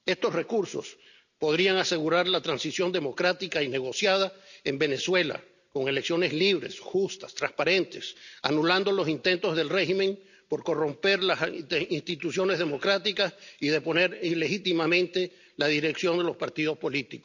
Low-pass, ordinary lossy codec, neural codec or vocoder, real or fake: 7.2 kHz; none; none; real